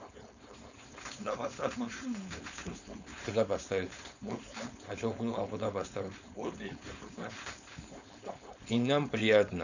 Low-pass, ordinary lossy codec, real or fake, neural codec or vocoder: 7.2 kHz; none; fake; codec, 16 kHz, 4.8 kbps, FACodec